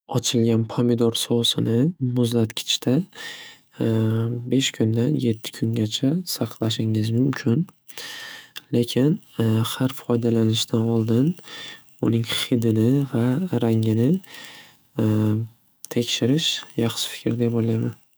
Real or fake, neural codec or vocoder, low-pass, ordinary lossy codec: fake; autoencoder, 48 kHz, 128 numbers a frame, DAC-VAE, trained on Japanese speech; none; none